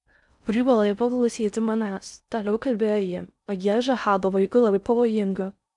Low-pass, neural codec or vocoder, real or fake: 10.8 kHz; codec, 16 kHz in and 24 kHz out, 0.6 kbps, FocalCodec, streaming, 4096 codes; fake